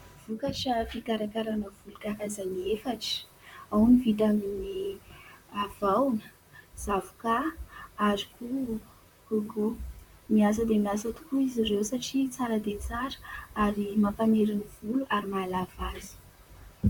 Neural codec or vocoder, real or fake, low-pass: vocoder, 44.1 kHz, 128 mel bands, Pupu-Vocoder; fake; 19.8 kHz